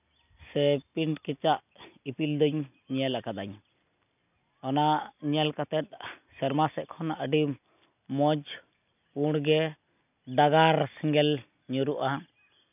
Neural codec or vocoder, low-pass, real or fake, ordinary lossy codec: none; 3.6 kHz; real; none